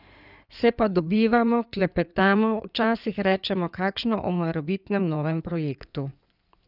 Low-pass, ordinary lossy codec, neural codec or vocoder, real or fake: 5.4 kHz; none; codec, 16 kHz in and 24 kHz out, 2.2 kbps, FireRedTTS-2 codec; fake